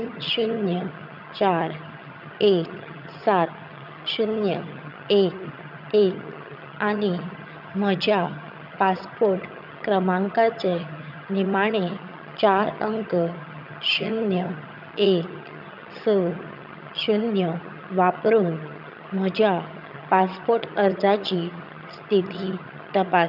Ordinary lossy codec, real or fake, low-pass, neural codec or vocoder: none; fake; 5.4 kHz; vocoder, 22.05 kHz, 80 mel bands, HiFi-GAN